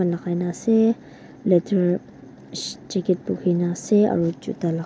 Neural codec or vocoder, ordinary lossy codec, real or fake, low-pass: none; none; real; none